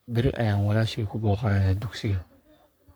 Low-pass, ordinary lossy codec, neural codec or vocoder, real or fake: none; none; codec, 44.1 kHz, 3.4 kbps, Pupu-Codec; fake